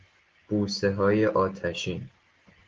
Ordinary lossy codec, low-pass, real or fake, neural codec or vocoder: Opus, 16 kbps; 7.2 kHz; real; none